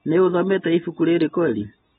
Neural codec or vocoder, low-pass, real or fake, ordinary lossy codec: none; 19.8 kHz; real; AAC, 16 kbps